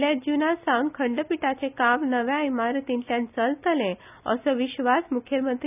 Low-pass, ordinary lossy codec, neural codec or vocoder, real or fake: 3.6 kHz; none; none; real